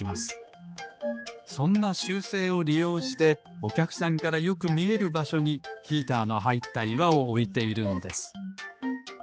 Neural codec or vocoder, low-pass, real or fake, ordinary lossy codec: codec, 16 kHz, 2 kbps, X-Codec, HuBERT features, trained on general audio; none; fake; none